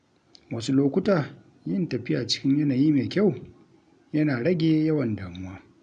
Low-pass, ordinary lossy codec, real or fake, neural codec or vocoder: 9.9 kHz; none; real; none